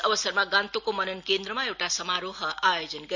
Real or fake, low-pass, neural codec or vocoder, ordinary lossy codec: real; 7.2 kHz; none; none